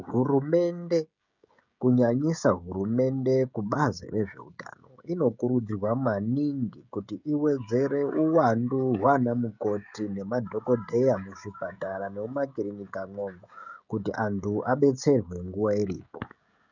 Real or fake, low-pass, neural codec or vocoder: fake; 7.2 kHz; codec, 16 kHz, 16 kbps, FreqCodec, smaller model